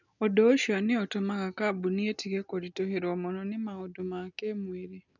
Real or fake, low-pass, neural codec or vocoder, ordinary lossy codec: real; 7.2 kHz; none; none